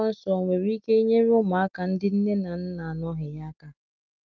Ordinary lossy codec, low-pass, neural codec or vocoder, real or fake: Opus, 32 kbps; 7.2 kHz; none; real